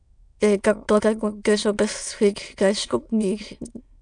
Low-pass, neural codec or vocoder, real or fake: 9.9 kHz; autoencoder, 22.05 kHz, a latent of 192 numbers a frame, VITS, trained on many speakers; fake